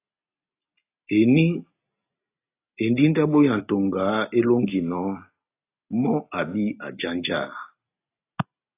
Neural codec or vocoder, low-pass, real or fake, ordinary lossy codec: none; 3.6 kHz; real; AAC, 24 kbps